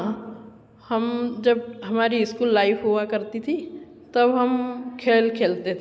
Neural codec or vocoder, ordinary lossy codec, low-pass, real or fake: none; none; none; real